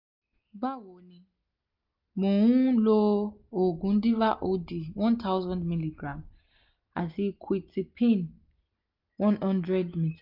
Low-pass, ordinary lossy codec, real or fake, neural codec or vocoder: 5.4 kHz; none; real; none